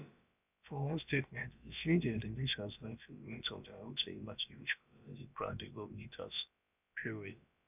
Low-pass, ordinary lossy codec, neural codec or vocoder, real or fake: 3.6 kHz; none; codec, 16 kHz, about 1 kbps, DyCAST, with the encoder's durations; fake